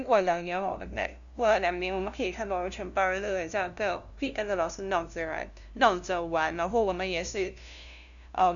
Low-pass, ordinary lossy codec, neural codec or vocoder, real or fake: 7.2 kHz; none; codec, 16 kHz, 0.5 kbps, FunCodec, trained on LibriTTS, 25 frames a second; fake